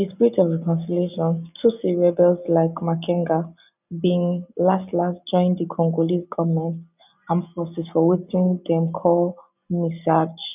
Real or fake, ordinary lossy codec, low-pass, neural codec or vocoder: real; none; 3.6 kHz; none